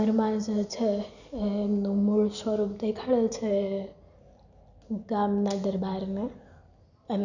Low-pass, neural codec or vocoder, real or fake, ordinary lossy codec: 7.2 kHz; none; real; none